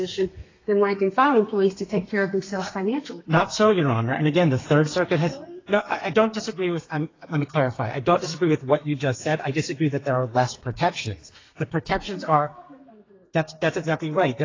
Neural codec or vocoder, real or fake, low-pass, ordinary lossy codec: codec, 32 kHz, 1.9 kbps, SNAC; fake; 7.2 kHz; AAC, 32 kbps